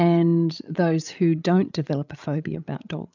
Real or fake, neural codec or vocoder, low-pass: fake; codec, 16 kHz, 16 kbps, FreqCodec, larger model; 7.2 kHz